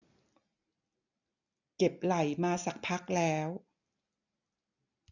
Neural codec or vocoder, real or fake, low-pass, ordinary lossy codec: none; real; 7.2 kHz; none